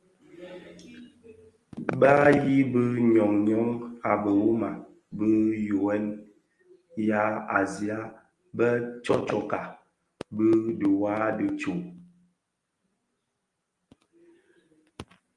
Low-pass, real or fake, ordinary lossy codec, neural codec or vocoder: 10.8 kHz; real; Opus, 32 kbps; none